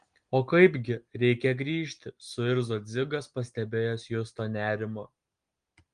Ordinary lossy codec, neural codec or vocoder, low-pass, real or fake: Opus, 24 kbps; none; 9.9 kHz; real